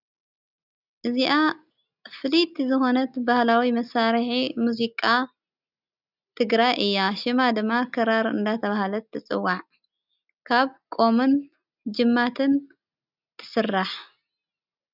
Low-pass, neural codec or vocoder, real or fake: 5.4 kHz; none; real